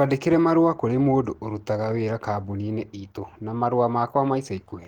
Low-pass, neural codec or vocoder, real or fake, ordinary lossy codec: 19.8 kHz; none; real; Opus, 16 kbps